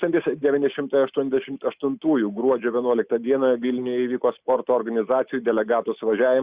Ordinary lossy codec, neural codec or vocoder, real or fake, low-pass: Opus, 64 kbps; none; real; 3.6 kHz